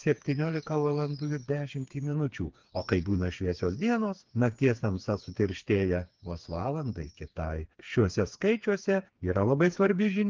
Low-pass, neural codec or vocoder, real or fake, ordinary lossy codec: 7.2 kHz; codec, 16 kHz, 4 kbps, FreqCodec, smaller model; fake; Opus, 32 kbps